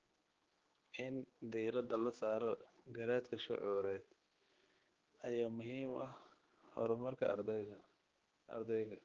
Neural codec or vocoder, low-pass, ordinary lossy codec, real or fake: codec, 16 kHz, 2 kbps, X-Codec, HuBERT features, trained on general audio; 7.2 kHz; Opus, 16 kbps; fake